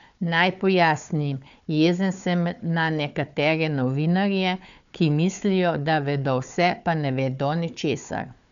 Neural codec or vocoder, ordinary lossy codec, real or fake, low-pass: codec, 16 kHz, 4 kbps, FunCodec, trained on Chinese and English, 50 frames a second; none; fake; 7.2 kHz